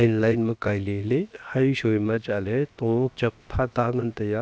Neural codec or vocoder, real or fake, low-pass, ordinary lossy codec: codec, 16 kHz, 0.7 kbps, FocalCodec; fake; none; none